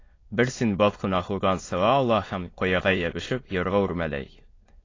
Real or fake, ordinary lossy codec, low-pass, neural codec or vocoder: fake; AAC, 32 kbps; 7.2 kHz; autoencoder, 22.05 kHz, a latent of 192 numbers a frame, VITS, trained on many speakers